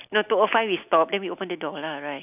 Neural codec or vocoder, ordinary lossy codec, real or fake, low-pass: none; none; real; 3.6 kHz